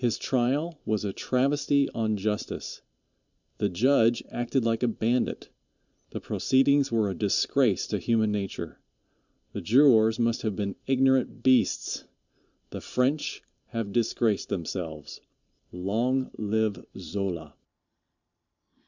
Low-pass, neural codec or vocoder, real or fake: 7.2 kHz; vocoder, 44.1 kHz, 128 mel bands every 512 samples, BigVGAN v2; fake